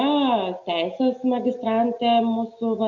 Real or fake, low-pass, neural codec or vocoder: real; 7.2 kHz; none